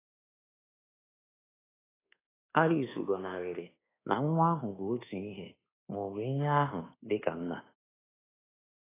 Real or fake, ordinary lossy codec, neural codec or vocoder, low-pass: fake; AAC, 16 kbps; codec, 24 kHz, 1.2 kbps, DualCodec; 3.6 kHz